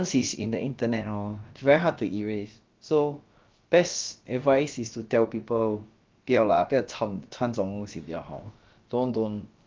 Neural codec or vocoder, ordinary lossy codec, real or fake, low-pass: codec, 16 kHz, about 1 kbps, DyCAST, with the encoder's durations; Opus, 32 kbps; fake; 7.2 kHz